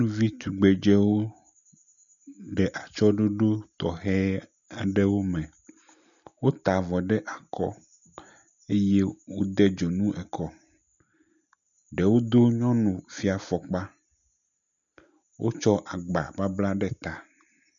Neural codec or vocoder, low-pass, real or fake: none; 7.2 kHz; real